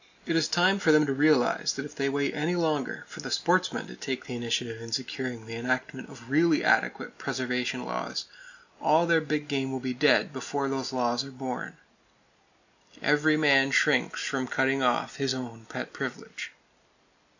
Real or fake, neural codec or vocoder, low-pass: real; none; 7.2 kHz